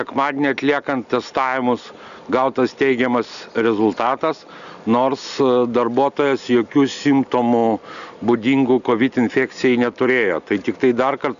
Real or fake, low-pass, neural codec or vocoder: real; 7.2 kHz; none